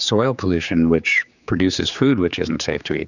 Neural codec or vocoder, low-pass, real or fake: codec, 16 kHz, 4 kbps, X-Codec, HuBERT features, trained on general audio; 7.2 kHz; fake